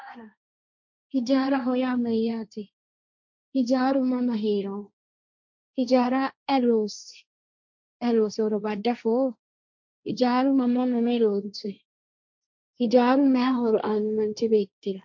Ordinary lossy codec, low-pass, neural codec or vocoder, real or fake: MP3, 64 kbps; 7.2 kHz; codec, 16 kHz, 1.1 kbps, Voila-Tokenizer; fake